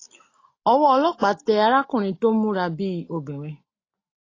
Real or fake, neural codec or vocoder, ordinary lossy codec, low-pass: real; none; AAC, 32 kbps; 7.2 kHz